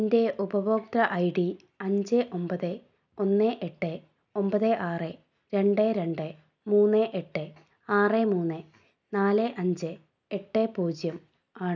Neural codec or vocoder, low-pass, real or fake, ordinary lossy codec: none; 7.2 kHz; real; none